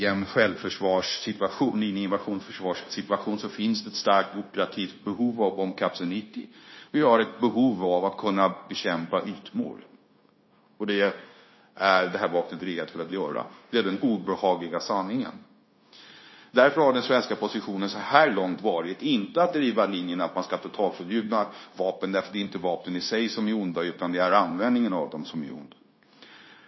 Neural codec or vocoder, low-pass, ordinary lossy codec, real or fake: codec, 16 kHz, 0.9 kbps, LongCat-Audio-Codec; 7.2 kHz; MP3, 24 kbps; fake